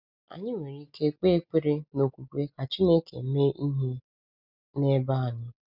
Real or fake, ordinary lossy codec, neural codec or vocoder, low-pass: real; none; none; 5.4 kHz